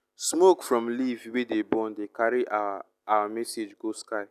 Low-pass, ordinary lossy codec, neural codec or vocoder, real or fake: 14.4 kHz; none; none; real